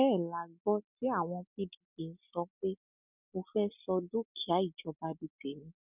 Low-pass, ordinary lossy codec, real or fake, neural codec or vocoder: 3.6 kHz; none; real; none